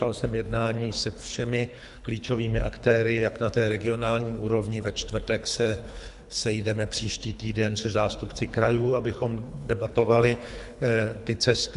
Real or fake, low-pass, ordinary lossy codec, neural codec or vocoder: fake; 10.8 kHz; AAC, 96 kbps; codec, 24 kHz, 3 kbps, HILCodec